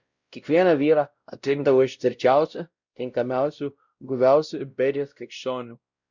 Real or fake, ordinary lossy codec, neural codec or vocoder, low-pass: fake; Opus, 64 kbps; codec, 16 kHz, 0.5 kbps, X-Codec, WavLM features, trained on Multilingual LibriSpeech; 7.2 kHz